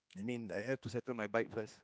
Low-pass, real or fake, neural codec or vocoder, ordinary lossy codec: none; fake; codec, 16 kHz, 2 kbps, X-Codec, HuBERT features, trained on general audio; none